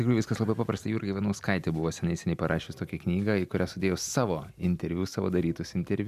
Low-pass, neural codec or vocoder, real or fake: 14.4 kHz; none; real